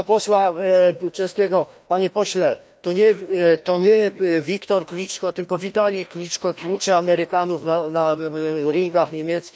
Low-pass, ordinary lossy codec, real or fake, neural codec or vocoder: none; none; fake; codec, 16 kHz, 1 kbps, FreqCodec, larger model